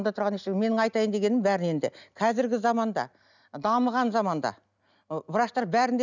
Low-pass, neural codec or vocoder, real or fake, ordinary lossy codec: 7.2 kHz; none; real; none